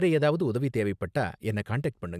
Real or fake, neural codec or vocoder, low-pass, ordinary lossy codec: real; none; 14.4 kHz; none